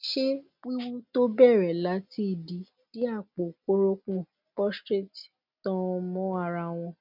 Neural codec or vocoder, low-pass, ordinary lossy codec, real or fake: none; 5.4 kHz; MP3, 48 kbps; real